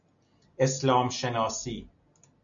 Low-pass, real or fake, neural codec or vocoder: 7.2 kHz; real; none